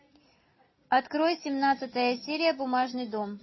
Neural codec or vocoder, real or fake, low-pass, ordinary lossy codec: none; real; 7.2 kHz; MP3, 24 kbps